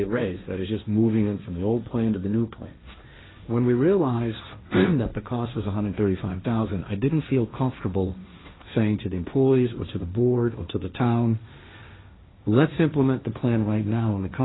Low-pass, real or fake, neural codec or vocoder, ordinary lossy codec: 7.2 kHz; fake; codec, 16 kHz, 1.1 kbps, Voila-Tokenizer; AAC, 16 kbps